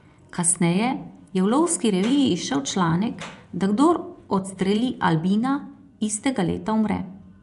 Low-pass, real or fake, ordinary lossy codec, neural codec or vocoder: 10.8 kHz; fake; none; vocoder, 24 kHz, 100 mel bands, Vocos